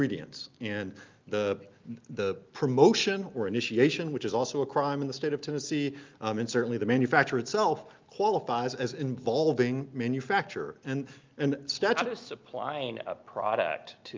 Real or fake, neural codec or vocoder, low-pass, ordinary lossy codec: real; none; 7.2 kHz; Opus, 24 kbps